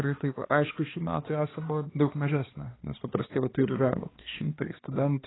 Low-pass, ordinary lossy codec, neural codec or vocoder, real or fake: 7.2 kHz; AAC, 16 kbps; codec, 16 kHz, 2 kbps, X-Codec, HuBERT features, trained on balanced general audio; fake